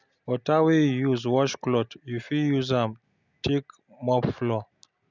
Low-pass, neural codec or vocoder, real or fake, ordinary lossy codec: 7.2 kHz; none; real; none